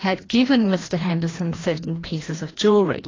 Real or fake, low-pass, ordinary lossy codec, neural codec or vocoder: fake; 7.2 kHz; AAC, 32 kbps; codec, 16 kHz, 1 kbps, FreqCodec, larger model